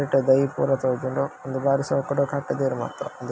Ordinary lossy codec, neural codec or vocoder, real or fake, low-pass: none; none; real; none